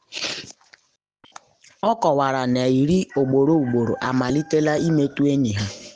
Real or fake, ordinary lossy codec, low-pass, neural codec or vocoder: real; Opus, 64 kbps; 9.9 kHz; none